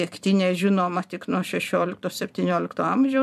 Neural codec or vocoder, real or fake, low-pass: vocoder, 44.1 kHz, 128 mel bands every 256 samples, BigVGAN v2; fake; 14.4 kHz